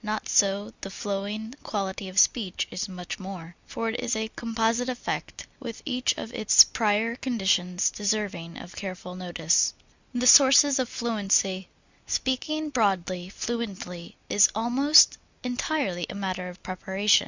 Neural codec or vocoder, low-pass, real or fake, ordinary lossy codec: none; 7.2 kHz; real; Opus, 64 kbps